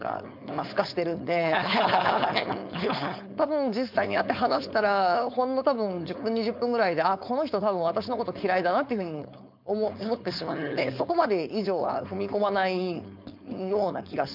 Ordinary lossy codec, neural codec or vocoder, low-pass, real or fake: MP3, 48 kbps; codec, 16 kHz, 4.8 kbps, FACodec; 5.4 kHz; fake